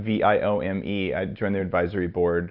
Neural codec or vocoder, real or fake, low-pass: none; real; 5.4 kHz